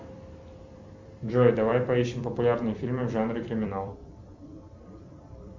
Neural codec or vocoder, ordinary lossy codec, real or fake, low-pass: vocoder, 44.1 kHz, 128 mel bands every 256 samples, BigVGAN v2; MP3, 64 kbps; fake; 7.2 kHz